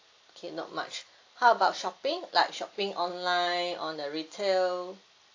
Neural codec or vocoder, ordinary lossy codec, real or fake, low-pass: none; AAC, 48 kbps; real; 7.2 kHz